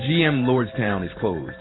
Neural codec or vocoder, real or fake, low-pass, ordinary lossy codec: vocoder, 44.1 kHz, 128 mel bands every 512 samples, BigVGAN v2; fake; 7.2 kHz; AAC, 16 kbps